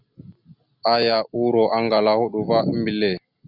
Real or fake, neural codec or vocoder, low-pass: real; none; 5.4 kHz